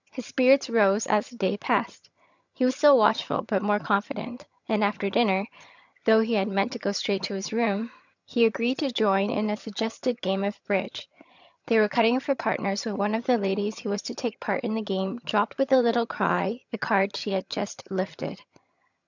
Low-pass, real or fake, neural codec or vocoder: 7.2 kHz; fake; vocoder, 22.05 kHz, 80 mel bands, HiFi-GAN